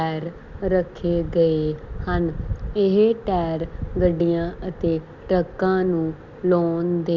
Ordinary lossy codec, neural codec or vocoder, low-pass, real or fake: Opus, 64 kbps; none; 7.2 kHz; real